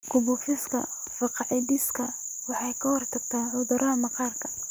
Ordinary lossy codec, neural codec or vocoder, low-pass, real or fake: none; none; none; real